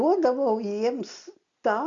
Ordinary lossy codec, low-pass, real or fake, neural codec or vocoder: Opus, 64 kbps; 7.2 kHz; real; none